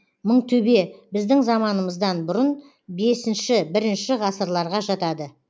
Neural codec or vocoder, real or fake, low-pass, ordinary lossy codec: none; real; none; none